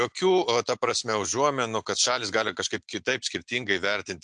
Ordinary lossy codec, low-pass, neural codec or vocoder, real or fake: MP3, 64 kbps; 9.9 kHz; none; real